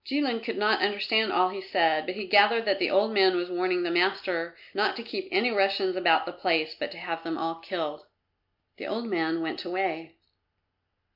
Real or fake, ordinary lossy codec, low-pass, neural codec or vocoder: real; MP3, 48 kbps; 5.4 kHz; none